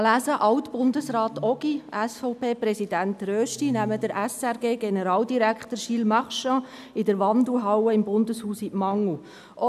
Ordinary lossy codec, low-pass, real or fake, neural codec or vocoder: none; 14.4 kHz; real; none